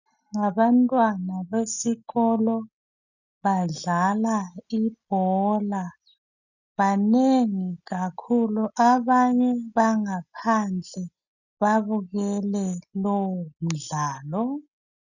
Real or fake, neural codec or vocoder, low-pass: real; none; 7.2 kHz